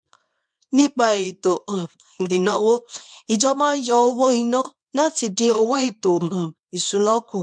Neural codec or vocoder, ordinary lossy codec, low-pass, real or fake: codec, 24 kHz, 0.9 kbps, WavTokenizer, small release; MP3, 64 kbps; 9.9 kHz; fake